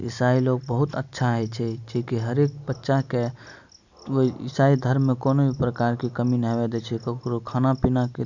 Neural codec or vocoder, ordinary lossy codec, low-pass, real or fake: none; none; 7.2 kHz; real